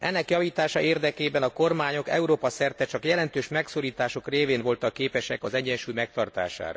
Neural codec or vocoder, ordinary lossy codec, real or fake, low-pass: none; none; real; none